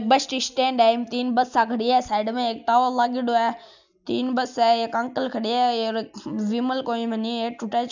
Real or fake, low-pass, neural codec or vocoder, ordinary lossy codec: real; 7.2 kHz; none; none